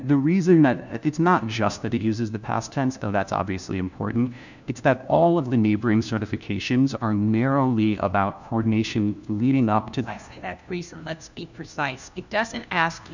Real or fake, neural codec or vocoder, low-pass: fake; codec, 16 kHz, 1 kbps, FunCodec, trained on LibriTTS, 50 frames a second; 7.2 kHz